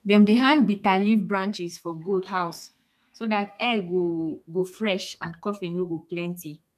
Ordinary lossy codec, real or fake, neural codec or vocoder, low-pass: none; fake; codec, 32 kHz, 1.9 kbps, SNAC; 14.4 kHz